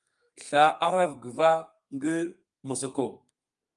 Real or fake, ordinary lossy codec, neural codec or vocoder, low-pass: fake; Opus, 32 kbps; codec, 32 kHz, 1.9 kbps, SNAC; 10.8 kHz